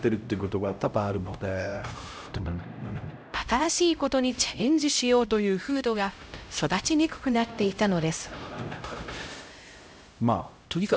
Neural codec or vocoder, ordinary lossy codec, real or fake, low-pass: codec, 16 kHz, 0.5 kbps, X-Codec, HuBERT features, trained on LibriSpeech; none; fake; none